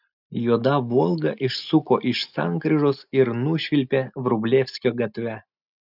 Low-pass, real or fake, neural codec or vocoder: 5.4 kHz; real; none